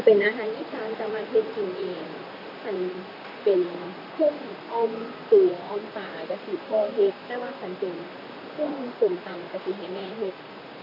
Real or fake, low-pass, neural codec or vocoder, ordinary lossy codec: fake; 5.4 kHz; vocoder, 44.1 kHz, 128 mel bands, Pupu-Vocoder; AAC, 32 kbps